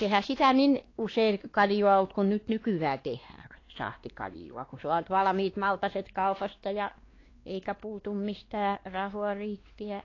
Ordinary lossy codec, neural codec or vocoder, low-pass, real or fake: AAC, 32 kbps; codec, 16 kHz, 2 kbps, X-Codec, WavLM features, trained on Multilingual LibriSpeech; 7.2 kHz; fake